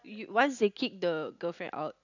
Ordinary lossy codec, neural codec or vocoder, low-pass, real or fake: none; none; 7.2 kHz; real